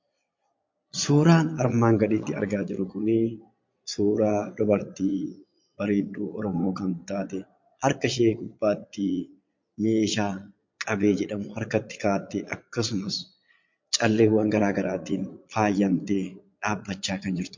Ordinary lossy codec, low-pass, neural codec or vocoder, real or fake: MP3, 48 kbps; 7.2 kHz; vocoder, 22.05 kHz, 80 mel bands, Vocos; fake